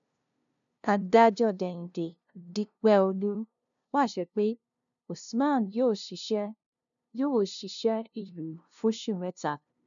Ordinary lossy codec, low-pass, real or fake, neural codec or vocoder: none; 7.2 kHz; fake; codec, 16 kHz, 0.5 kbps, FunCodec, trained on LibriTTS, 25 frames a second